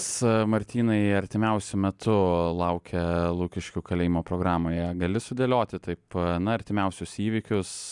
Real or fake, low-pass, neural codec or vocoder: real; 10.8 kHz; none